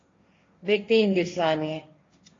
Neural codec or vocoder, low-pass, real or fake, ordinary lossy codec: codec, 16 kHz, 1.1 kbps, Voila-Tokenizer; 7.2 kHz; fake; AAC, 32 kbps